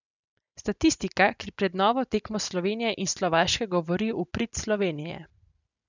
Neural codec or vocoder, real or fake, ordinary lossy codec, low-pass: none; real; none; 7.2 kHz